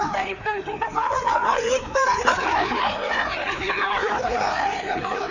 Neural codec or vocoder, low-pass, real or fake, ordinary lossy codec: codec, 16 kHz, 2 kbps, FreqCodec, larger model; 7.2 kHz; fake; none